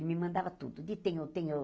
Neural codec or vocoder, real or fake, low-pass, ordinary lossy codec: none; real; none; none